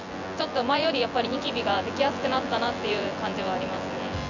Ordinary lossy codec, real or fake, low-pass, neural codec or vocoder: none; fake; 7.2 kHz; vocoder, 24 kHz, 100 mel bands, Vocos